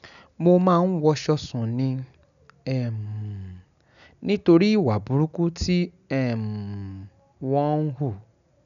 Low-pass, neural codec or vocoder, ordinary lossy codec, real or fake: 7.2 kHz; none; none; real